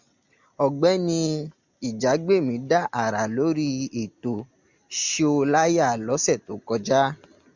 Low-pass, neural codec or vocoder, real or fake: 7.2 kHz; none; real